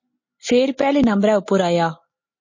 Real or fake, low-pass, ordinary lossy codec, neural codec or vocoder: real; 7.2 kHz; MP3, 32 kbps; none